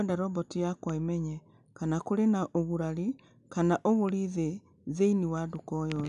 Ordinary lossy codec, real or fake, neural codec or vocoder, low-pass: none; real; none; 10.8 kHz